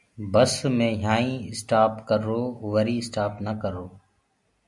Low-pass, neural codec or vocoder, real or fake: 10.8 kHz; none; real